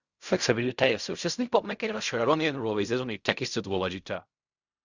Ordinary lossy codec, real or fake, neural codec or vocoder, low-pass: Opus, 64 kbps; fake; codec, 16 kHz in and 24 kHz out, 0.4 kbps, LongCat-Audio-Codec, fine tuned four codebook decoder; 7.2 kHz